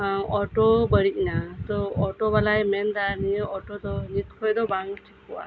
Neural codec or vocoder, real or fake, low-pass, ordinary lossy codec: none; real; none; none